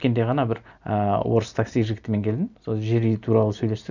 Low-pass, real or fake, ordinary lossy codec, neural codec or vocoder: 7.2 kHz; real; none; none